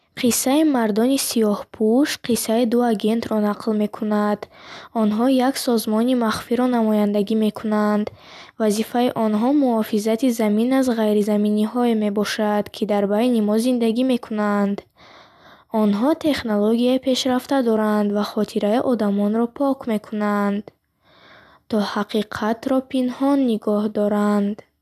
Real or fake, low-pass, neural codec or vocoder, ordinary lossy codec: real; 14.4 kHz; none; none